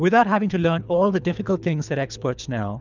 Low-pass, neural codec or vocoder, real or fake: 7.2 kHz; codec, 24 kHz, 3 kbps, HILCodec; fake